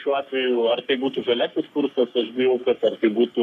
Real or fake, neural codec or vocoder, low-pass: fake; codec, 44.1 kHz, 3.4 kbps, Pupu-Codec; 14.4 kHz